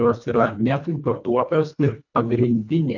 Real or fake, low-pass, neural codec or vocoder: fake; 7.2 kHz; codec, 24 kHz, 1.5 kbps, HILCodec